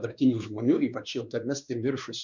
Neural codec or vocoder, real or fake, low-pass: codec, 16 kHz, 2 kbps, X-Codec, WavLM features, trained on Multilingual LibriSpeech; fake; 7.2 kHz